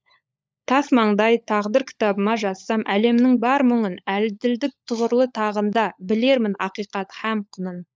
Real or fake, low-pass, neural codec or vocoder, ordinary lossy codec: fake; none; codec, 16 kHz, 16 kbps, FunCodec, trained on LibriTTS, 50 frames a second; none